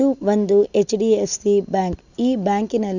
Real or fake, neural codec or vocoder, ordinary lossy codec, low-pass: real; none; none; 7.2 kHz